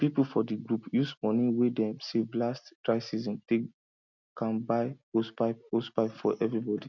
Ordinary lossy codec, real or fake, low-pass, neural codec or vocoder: none; real; 7.2 kHz; none